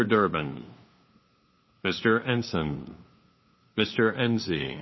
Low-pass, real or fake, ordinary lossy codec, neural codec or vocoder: 7.2 kHz; fake; MP3, 24 kbps; codec, 16 kHz, 2 kbps, FunCodec, trained on LibriTTS, 25 frames a second